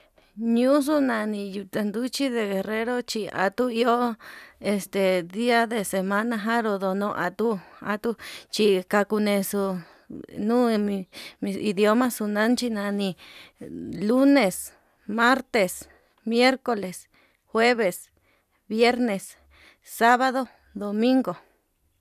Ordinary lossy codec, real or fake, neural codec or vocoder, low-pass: none; fake; vocoder, 44.1 kHz, 128 mel bands every 256 samples, BigVGAN v2; 14.4 kHz